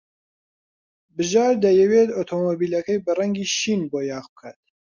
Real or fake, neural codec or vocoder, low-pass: real; none; 7.2 kHz